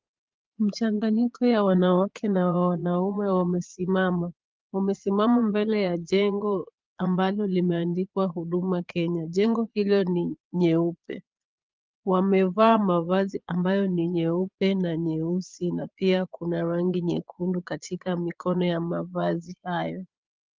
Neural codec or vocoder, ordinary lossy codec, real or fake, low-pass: vocoder, 22.05 kHz, 80 mel bands, Vocos; Opus, 32 kbps; fake; 7.2 kHz